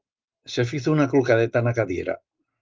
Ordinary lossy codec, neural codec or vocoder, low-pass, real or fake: Opus, 32 kbps; none; 7.2 kHz; real